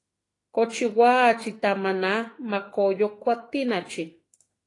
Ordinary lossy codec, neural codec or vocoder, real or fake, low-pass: AAC, 32 kbps; autoencoder, 48 kHz, 32 numbers a frame, DAC-VAE, trained on Japanese speech; fake; 10.8 kHz